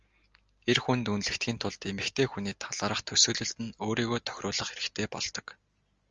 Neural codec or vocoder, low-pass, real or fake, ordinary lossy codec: none; 7.2 kHz; real; Opus, 32 kbps